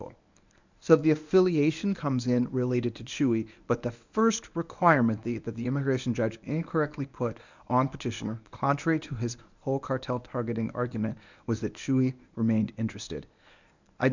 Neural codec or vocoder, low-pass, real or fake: codec, 24 kHz, 0.9 kbps, WavTokenizer, medium speech release version 1; 7.2 kHz; fake